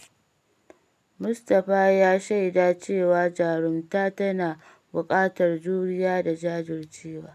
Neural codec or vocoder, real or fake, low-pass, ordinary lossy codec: none; real; 14.4 kHz; none